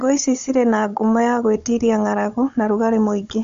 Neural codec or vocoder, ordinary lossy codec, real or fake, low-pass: codec, 16 kHz, 16 kbps, FunCodec, trained on Chinese and English, 50 frames a second; MP3, 48 kbps; fake; 7.2 kHz